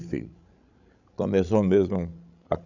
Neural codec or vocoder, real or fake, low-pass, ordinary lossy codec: codec, 16 kHz, 16 kbps, FreqCodec, larger model; fake; 7.2 kHz; none